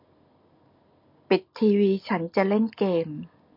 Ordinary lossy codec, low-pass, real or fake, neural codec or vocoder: MP3, 32 kbps; 5.4 kHz; real; none